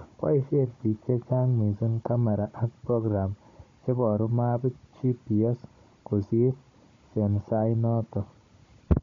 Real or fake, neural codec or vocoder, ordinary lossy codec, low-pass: fake; codec, 16 kHz, 16 kbps, FunCodec, trained on Chinese and English, 50 frames a second; MP3, 48 kbps; 7.2 kHz